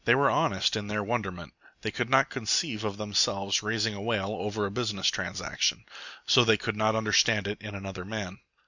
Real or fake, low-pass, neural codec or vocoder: real; 7.2 kHz; none